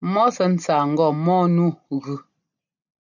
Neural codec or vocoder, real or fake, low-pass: none; real; 7.2 kHz